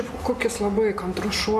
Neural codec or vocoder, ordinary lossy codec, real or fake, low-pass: none; Opus, 64 kbps; real; 14.4 kHz